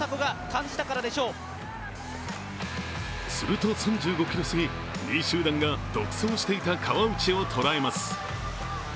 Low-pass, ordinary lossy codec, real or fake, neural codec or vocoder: none; none; real; none